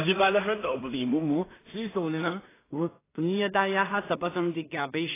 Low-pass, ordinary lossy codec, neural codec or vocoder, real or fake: 3.6 kHz; AAC, 16 kbps; codec, 16 kHz in and 24 kHz out, 0.4 kbps, LongCat-Audio-Codec, two codebook decoder; fake